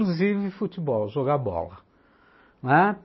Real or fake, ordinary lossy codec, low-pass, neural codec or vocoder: real; MP3, 24 kbps; 7.2 kHz; none